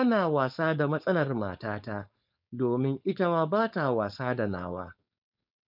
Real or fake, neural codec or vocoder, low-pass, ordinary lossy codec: fake; codec, 16 kHz, 4.8 kbps, FACodec; 5.4 kHz; none